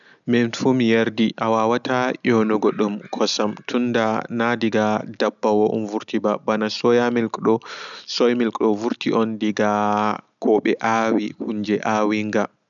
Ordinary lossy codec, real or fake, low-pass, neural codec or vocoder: none; real; 7.2 kHz; none